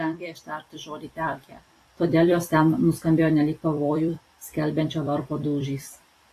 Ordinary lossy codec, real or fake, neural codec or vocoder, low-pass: AAC, 48 kbps; fake; vocoder, 44.1 kHz, 128 mel bands every 256 samples, BigVGAN v2; 14.4 kHz